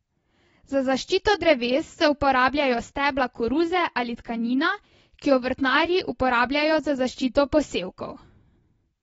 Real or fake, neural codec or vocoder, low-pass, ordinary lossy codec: real; none; 19.8 kHz; AAC, 24 kbps